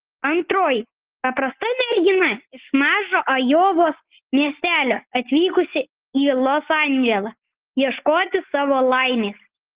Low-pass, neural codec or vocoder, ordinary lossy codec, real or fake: 3.6 kHz; none; Opus, 16 kbps; real